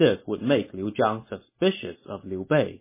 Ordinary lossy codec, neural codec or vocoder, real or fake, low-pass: MP3, 16 kbps; none; real; 3.6 kHz